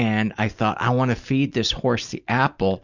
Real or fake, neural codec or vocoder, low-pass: real; none; 7.2 kHz